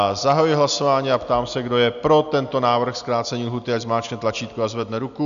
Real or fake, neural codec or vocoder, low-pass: real; none; 7.2 kHz